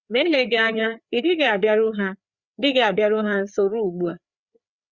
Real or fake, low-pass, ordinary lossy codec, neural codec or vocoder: fake; 7.2 kHz; Opus, 64 kbps; codec, 16 kHz, 4 kbps, FreqCodec, larger model